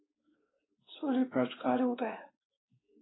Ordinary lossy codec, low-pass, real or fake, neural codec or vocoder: AAC, 16 kbps; 7.2 kHz; fake; codec, 16 kHz, 2 kbps, X-Codec, WavLM features, trained on Multilingual LibriSpeech